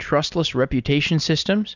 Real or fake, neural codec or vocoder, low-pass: real; none; 7.2 kHz